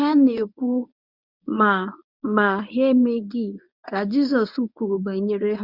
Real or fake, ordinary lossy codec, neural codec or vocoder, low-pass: fake; none; codec, 24 kHz, 0.9 kbps, WavTokenizer, medium speech release version 1; 5.4 kHz